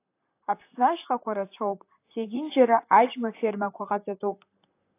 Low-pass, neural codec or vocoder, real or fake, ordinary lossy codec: 3.6 kHz; vocoder, 44.1 kHz, 128 mel bands, Pupu-Vocoder; fake; AAC, 24 kbps